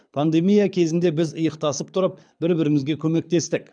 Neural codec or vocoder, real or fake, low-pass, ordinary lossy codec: codec, 24 kHz, 6 kbps, HILCodec; fake; 9.9 kHz; none